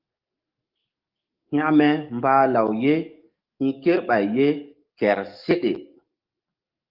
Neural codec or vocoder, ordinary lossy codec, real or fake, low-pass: codec, 44.1 kHz, 7.8 kbps, DAC; Opus, 24 kbps; fake; 5.4 kHz